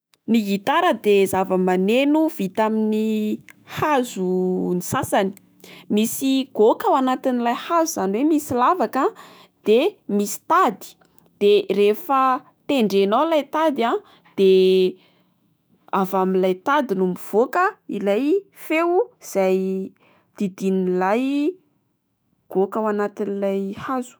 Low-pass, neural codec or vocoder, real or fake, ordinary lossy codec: none; autoencoder, 48 kHz, 128 numbers a frame, DAC-VAE, trained on Japanese speech; fake; none